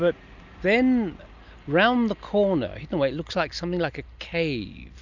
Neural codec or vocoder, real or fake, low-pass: none; real; 7.2 kHz